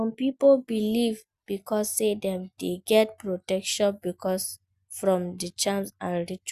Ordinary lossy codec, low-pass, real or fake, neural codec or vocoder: Opus, 64 kbps; 14.4 kHz; real; none